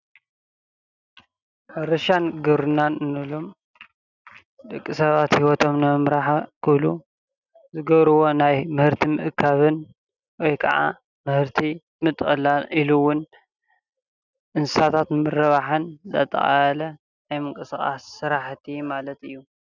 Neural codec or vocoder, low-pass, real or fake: none; 7.2 kHz; real